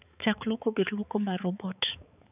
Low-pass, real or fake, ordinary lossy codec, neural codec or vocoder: 3.6 kHz; fake; none; codec, 16 kHz, 4 kbps, X-Codec, HuBERT features, trained on balanced general audio